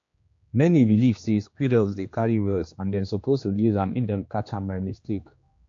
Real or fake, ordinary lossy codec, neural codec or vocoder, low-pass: fake; AAC, 48 kbps; codec, 16 kHz, 2 kbps, X-Codec, HuBERT features, trained on general audio; 7.2 kHz